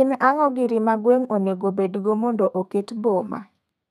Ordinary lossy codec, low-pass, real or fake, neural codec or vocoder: none; 14.4 kHz; fake; codec, 32 kHz, 1.9 kbps, SNAC